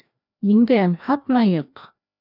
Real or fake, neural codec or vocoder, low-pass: fake; codec, 16 kHz, 1 kbps, FreqCodec, larger model; 5.4 kHz